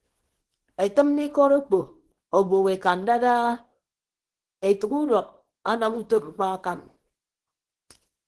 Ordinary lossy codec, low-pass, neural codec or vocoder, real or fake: Opus, 16 kbps; 10.8 kHz; codec, 24 kHz, 0.9 kbps, WavTokenizer, small release; fake